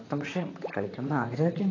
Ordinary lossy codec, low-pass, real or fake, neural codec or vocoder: AAC, 32 kbps; 7.2 kHz; fake; vocoder, 44.1 kHz, 128 mel bands, Pupu-Vocoder